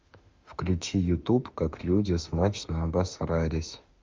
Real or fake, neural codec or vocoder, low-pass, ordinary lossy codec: fake; autoencoder, 48 kHz, 32 numbers a frame, DAC-VAE, trained on Japanese speech; 7.2 kHz; Opus, 32 kbps